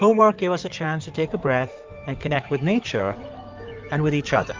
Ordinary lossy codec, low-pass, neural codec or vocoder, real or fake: Opus, 32 kbps; 7.2 kHz; codec, 16 kHz in and 24 kHz out, 2.2 kbps, FireRedTTS-2 codec; fake